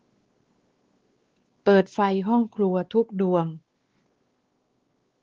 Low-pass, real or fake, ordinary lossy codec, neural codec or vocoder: 7.2 kHz; fake; Opus, 16 kbps; codec, 16 kHz, 2 kbps, X-Codec, WavLM features, trained on Multilingual LibriSpeech